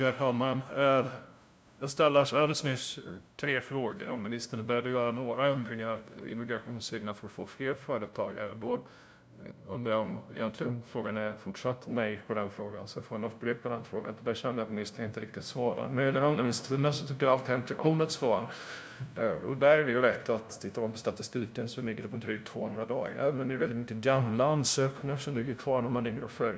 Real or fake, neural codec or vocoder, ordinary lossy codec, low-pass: fake; codec, 16 kHz, 0.5 kbps, FunCodec, trained on LibriTTS, 25 frames a second; none; none